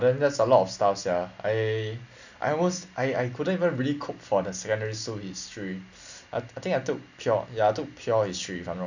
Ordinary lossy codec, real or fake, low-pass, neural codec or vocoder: none; real; 7.2 kHz; none